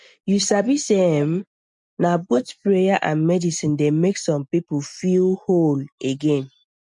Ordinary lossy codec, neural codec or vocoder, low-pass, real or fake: MP3, 64 kbps; none; 10.8 kHz; real